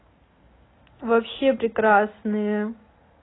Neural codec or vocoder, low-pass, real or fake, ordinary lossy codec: none; 7.2 kHz; real; AAC, 16 kbps